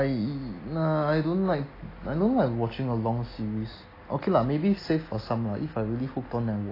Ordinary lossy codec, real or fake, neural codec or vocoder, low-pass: AAC, 24 kbps; real; none; 5.4 kHz